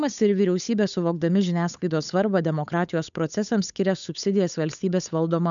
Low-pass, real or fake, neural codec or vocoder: 7.2 kHz; fake; codec, 16 kHz, 8 kbps, FunCodec, trained on Chinese and English, 25 frames a second